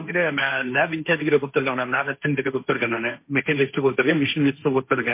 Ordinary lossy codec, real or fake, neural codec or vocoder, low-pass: MP3, 24 kbps; fake; codec, 16 kHz, 1.1 kbps, Voila-Tokenizer; 3.6 kHz